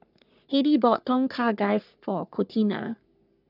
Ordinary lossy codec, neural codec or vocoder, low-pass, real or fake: none; codec, 44.1 kHz, 3.4 kbps, Pupu-Codec; 5.4 kHz; fake